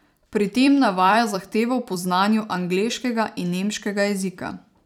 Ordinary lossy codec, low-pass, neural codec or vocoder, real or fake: none; 19.8 kHz; vocoder, 44.1 kHz, 128 mel bands every 256 samples, BigVGAN v2; fake